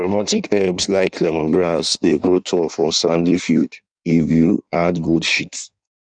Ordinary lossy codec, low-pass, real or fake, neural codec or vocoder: none; 9.9 kHz; fake; codec, 16 kHz in and 24 kHz out, 1.1 kbps, FireRedTTS-2 codec